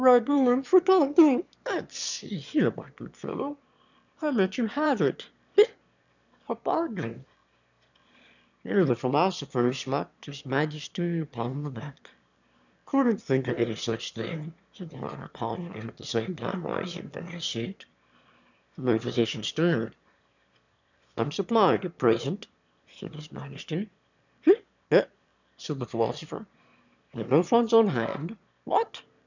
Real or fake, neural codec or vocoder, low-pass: fake; autoencoder, 22.05 kHz, a latent of 192 numbers a frame, VITS, trained on one speaker; 7.2 kHz